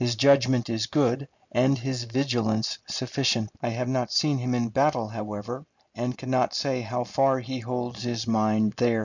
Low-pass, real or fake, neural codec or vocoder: 7.2 kHz; real; none